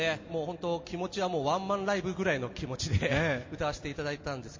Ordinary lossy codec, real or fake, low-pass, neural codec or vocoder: MP3, 32 kbps; real; 7.2 kHz; none